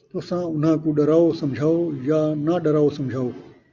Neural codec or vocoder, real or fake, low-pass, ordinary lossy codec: none; real; 7.2 kHz; MP3, 64 kbps